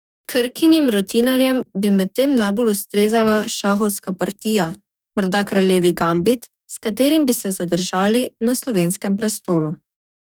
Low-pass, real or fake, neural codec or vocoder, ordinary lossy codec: none; fake; codec, 44.1 kHz, 2.6 kbps, DAC; none